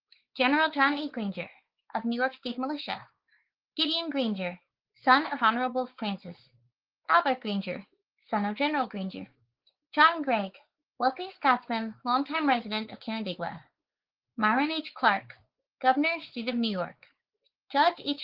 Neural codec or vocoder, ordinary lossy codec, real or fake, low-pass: codec, 16 kHz, 4 kbps, X-Codec, WavLM features, trained on Multilingual LibriSpeech; Opus, 16 kbps; fake; 5.4 kHz